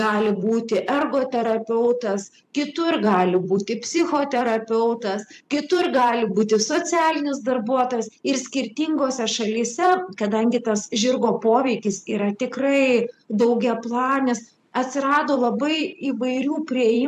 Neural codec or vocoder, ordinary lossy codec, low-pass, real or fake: vocoder, 44.1 kHz, 128 mel bands every 256 samples, BigVGAN v2; AAC, 96 kbps; 14.4 kHz; fake